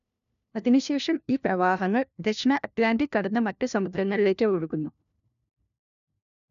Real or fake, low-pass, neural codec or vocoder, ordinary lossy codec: fake; 7.2 kHz; codec, 16 kHz, 1 kbps, FunCodec, trained on LibriTTS, 50 frames a second; none